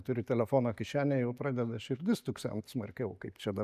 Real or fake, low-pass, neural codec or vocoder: real; 14.4 kHz; none